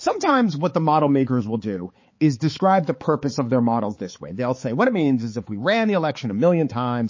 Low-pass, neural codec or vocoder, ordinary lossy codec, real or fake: 7.2 kHz; codec, 16 kHz, 4 kbps, X-Codec, HuBERT features, trained on balanced general audio; MP3, 32 kbps; fake